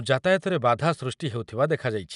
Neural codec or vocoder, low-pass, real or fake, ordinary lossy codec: none; 10.8 kHz; real; none